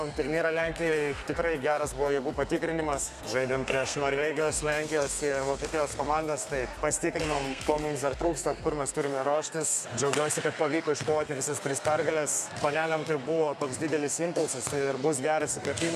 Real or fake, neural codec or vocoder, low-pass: fake; codec, 32 kHz, 1.9 kbps, SNAC; 14.4 kHz